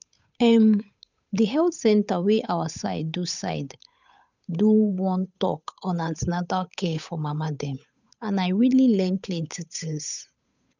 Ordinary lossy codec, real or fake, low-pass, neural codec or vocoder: none; fake; 7.2 kHz; codec, 16 kHz, 8 kbps, FunCodec, trained on Chinese and English, 25 frames a second